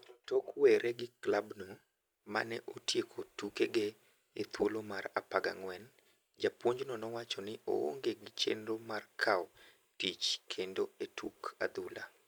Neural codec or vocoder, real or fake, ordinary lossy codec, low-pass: none; real; none; none